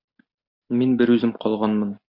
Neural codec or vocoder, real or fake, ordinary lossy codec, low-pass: none; real; MP3, 48 kbps; 5.4 kHz